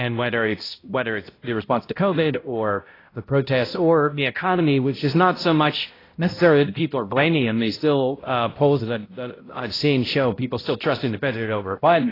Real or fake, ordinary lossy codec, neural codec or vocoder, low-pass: fake; AAC, 24 kbps; codec, 16 kHz, 0.5 kbps, X-Codec, HuBERT features, trained on balanced general audio; 5.4 kHz